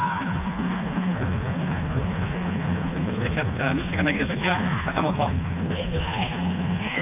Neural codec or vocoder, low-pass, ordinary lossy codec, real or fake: codec, 16 kHz, 2 kbps, FreqCodec, smaller model; 3.6 kHz; MP3, 32 kbps; fake